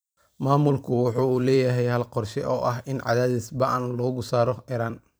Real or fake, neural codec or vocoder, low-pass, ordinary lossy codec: fake; vocoder, 44.1 kHz, 128 mel bands every 256 samples, BigVGAN v2; none; none